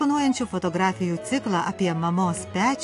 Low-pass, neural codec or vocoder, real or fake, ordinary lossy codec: 10.8 kHz; none; real; AAC, 48 kbps